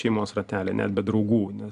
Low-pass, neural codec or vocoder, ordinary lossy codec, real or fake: 10.8 kHz; none; AAC, 96 kbps; real